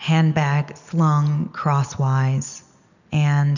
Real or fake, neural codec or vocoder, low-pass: real; none; 7.2 kHz